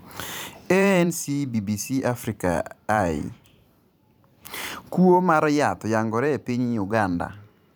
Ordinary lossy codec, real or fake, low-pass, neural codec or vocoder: none; fake; none; vocoder, 44.1 kHz, 128 mel bands every 512 samples, BigVGAN v2